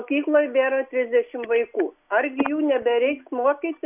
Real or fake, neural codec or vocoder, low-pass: real; none; 3.6 kHz